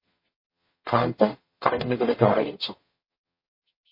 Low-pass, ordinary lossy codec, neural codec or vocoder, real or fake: 5.4 kHz; MP3, 24 kbps; codec, 44.1 kHz, 0.9 kbps, DAC; fake